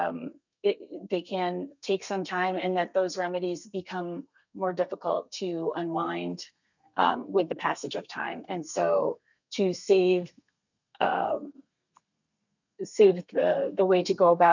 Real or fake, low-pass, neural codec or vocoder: fake; 7.2 kHz; codec, 32 kHz, 1.9 kbps, SNAC